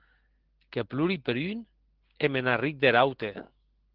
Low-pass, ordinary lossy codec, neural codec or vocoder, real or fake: 5.4 kHz; Opus, 16 kbps; none; real